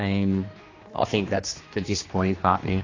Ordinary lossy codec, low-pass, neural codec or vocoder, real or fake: AAC, 32 kbps; 7.2 kHz; codec, 16 kHz, 2 kbps, X-Codec, HuBERT features, trained on general audio; fake